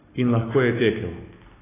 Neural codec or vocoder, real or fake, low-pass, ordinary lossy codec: none; real; 3.6 kHz; AAC, 16 kbps